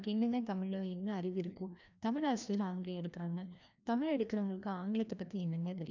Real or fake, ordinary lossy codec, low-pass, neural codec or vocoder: fake; none; 7.2 kHz; codec, 16 kHz, 1 kbps, FreqCodec, larger model